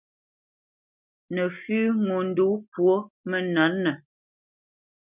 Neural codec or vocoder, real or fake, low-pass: none; real; 3.6 kHz